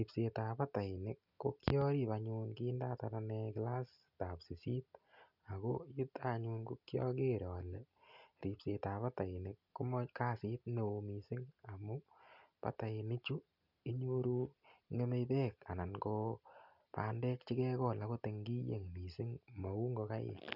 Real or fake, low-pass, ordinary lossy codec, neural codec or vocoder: real; 5.4 kHz; none; none